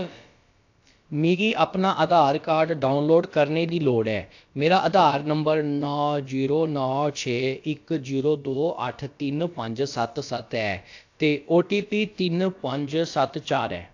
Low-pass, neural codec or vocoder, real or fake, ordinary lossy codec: 7.2 kHz; codec, 16 kHz, about 1 kbps, DyCAST, with the encoder's durations; fake; AAC, 48 kbps